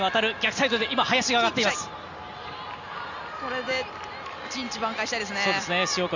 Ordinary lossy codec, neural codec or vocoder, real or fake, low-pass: none; none; real; 7.2 kHz